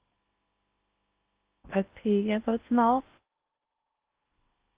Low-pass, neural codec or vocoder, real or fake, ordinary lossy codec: 3.6 kHz; codec, 16 kHz in and 24 kHz out, 0.6 kbps, FocalCodec, streaming, 2048 codes; fake; Opus, 32 kbps